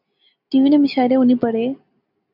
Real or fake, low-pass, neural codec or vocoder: real; 5.4 kHz; none